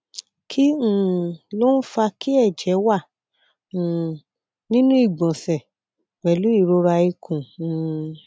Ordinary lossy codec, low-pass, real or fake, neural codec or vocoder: none; none; real; none